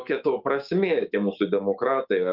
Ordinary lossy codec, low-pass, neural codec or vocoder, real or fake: Opus, 24 kbps; 5.4 kHz; codec, 16 kHz, 6 kbps, DAC; fake